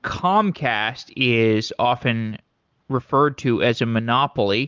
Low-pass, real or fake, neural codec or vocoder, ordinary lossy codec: 7.2 kHz; real; none; Opus, 24 kbps